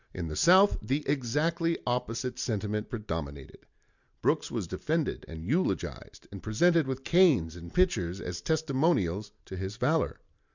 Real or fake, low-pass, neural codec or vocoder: real; 7.2 kHz; none